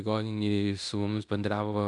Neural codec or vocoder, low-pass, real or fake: codec, 16 kHz in and 24 kHz out, 0.9 kbps, LongCat-Audio-Codec, fine tuned four codebook decoder; 10.8 kHz; fake